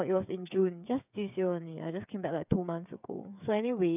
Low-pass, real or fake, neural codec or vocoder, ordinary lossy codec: 3.6 kHz; fake; codec, 16 kHz, 8 kbps, FreqCodec, smaller model; none